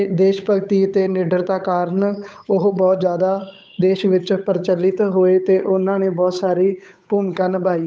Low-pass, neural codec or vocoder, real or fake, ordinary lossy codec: none; codec, 16 kHz, 8 kbps, FunCodec, trained on Chinese and English, 25 frames a second; fake; none